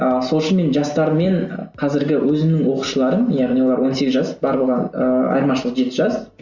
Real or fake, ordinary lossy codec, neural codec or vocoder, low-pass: real; Opus, 64 kbps; none; 7.2 kHz